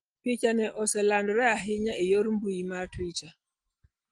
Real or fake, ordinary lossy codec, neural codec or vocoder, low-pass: real; Opus, 24 kbps; none; 9.9 kHz